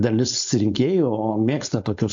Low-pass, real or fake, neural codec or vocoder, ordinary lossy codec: 7.2 kHz; fake; codec, 16 kHz, 4.8 kbps, FACodec; AAC, 64 kbps